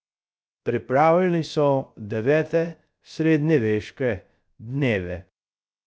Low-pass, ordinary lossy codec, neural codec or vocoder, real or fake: none; none; codec, 16 kHz, 0.3 kbps, FocalCodec; fake